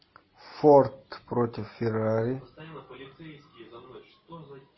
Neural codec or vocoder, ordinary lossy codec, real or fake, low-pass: none; MP3, 24 kbps; real; 7.2 kHz